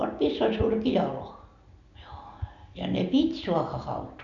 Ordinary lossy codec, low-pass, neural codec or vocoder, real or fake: MP3, 96 kbps; 7.2 kHz; none; real